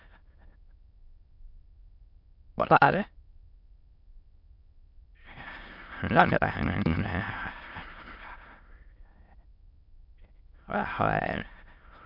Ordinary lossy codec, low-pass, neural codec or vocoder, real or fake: MP3, 48 kbps; 5.4 kHz; autoencoder, 22.05 kHz, a latent of 192 numbers a frame, VITS, trained on many speakers; fake